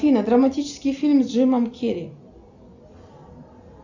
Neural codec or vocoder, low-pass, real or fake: none; 7.2 kHz; real